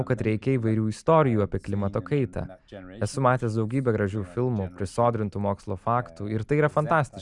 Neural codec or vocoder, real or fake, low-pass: none; real; 10.8 kHz